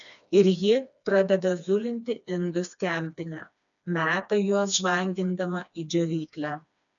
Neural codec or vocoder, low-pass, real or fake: codec, 16 kHz, 2 kbps, FreqCodec, smaller model; 7.2 kHz; fake